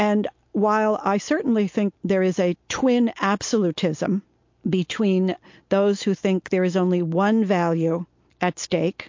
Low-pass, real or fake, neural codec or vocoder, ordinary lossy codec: 7.2 kHz; real; none; MP3, 48 kbps